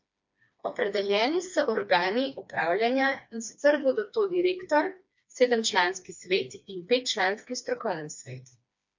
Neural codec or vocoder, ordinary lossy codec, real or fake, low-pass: codec, 16 kHz, 2 kbps, FreqCodec, smaller model; MP3, 64 kbps; fake; 7.2 kHz